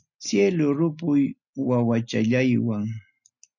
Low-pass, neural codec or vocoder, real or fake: 7.2 kHz; none; real